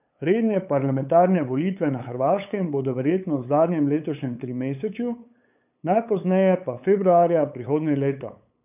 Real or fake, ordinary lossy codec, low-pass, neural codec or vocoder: fake; none; 3.6 kHz; codec, 16 kHz, 8 kbps, FunCodec, trained on LibriTTS, 25 frames a second